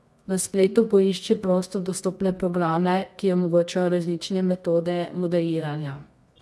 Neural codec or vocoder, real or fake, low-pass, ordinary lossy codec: codec, 24 kHz, 0.9 kbps, WavTokenizer, medium music audio release; fake; none; none